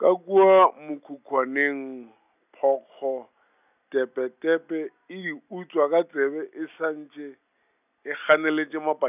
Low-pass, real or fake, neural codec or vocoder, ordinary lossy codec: 3.6 kHz; real; none; none